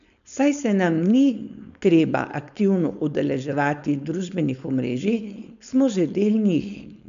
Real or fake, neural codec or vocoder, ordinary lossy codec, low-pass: fake; codec, 16 kHz, 4.8 kbps, FACodec; none; 7.2 kHz